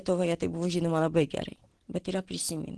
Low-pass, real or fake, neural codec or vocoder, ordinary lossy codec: 10.8 kHz; real; none; Opus, 16 kbps